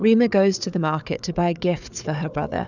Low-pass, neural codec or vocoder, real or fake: 7.2 kHz; codec, 16 kHz, 4 kbps, FunCodec, trained on Chinese and English, 50 frames a second; fake